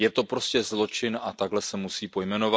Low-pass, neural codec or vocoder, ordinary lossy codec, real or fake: none; none; none; real